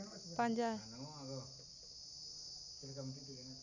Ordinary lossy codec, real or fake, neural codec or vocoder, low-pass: none; real; none; 7.2 kHz